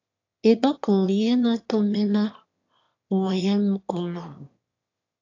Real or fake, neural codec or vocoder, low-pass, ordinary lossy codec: fake; autoencoder, 22.05 kHz, a latent of 192 numbers a frame, VITS, trained on one speaker; 7.2 kHz; AAC, 48 kbps